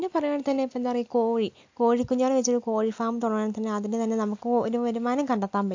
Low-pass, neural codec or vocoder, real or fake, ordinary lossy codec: 7.2 kHz; none; real; AAC, 48 kbps